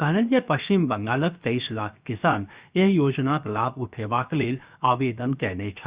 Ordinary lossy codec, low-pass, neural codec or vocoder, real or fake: Opus, 64 kbps; 3.6 kHz; codec, 16 kHz, 0.7 kbps, FocalCodec; fake